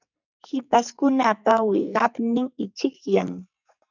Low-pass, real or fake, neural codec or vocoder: 7.2 kHz; fake; codec, 44.1 kHz, 2.6 kbps, SNAC